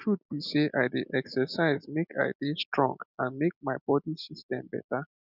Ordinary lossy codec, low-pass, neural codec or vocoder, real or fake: none; 5.4 kHz; none; real